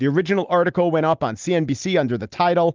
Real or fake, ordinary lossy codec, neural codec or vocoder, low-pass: real; Opus, 32 kbps; none; 7.2 kHz